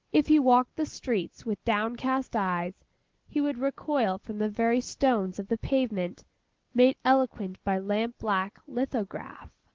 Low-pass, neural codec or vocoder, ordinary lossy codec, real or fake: 7.2 kHz; none; Opus, 16 kbps; real